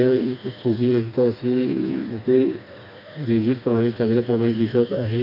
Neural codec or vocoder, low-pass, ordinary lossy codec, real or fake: codec, 16 kHz, 2 kbps, FreqCodec, smaller model; 5.4 kHz; none; fake